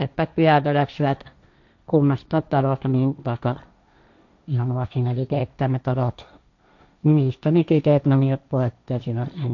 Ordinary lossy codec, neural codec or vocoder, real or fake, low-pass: none; codec, 16 kHz, 1.1 kbps, Voila-Tokenizer; fake; 7.2 kHz